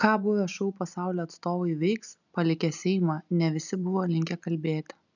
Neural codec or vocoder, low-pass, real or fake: none; 7.2 kHz; real